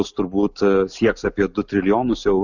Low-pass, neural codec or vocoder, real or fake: 7.2 kHz; none; real